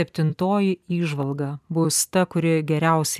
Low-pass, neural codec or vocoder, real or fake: 14.4 kHz; vocoder, 44.1 kHz, 128 mel bands every 256 samples, BigVGAN v2; fake